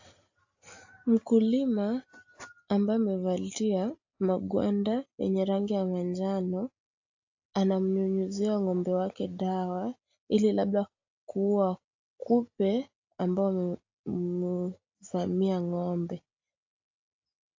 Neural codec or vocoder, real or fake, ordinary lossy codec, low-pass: none; real; AAC, 48 kbps; 7.2 kHz